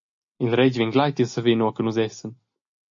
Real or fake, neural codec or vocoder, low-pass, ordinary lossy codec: real; none; 7.2 kHz; AAC, 64 kbps